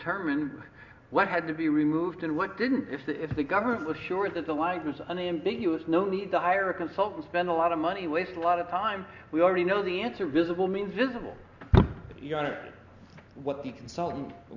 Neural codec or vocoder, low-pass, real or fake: none; 7.2 kHz; real